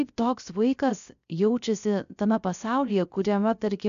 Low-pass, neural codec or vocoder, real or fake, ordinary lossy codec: 7.2 kHz; codec, 16 kHz, 0.3 kbps, FocalCodec; fake; MP3, 96 kbps